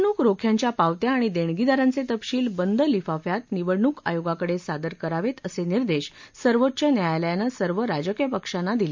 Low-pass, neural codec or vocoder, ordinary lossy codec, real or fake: 7.2 kHz; none; MP3, 64 kbps; real